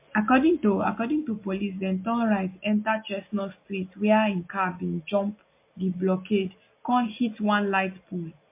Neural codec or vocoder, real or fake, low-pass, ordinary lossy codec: none; real; 3.6 kHz; MP3, 24 kbps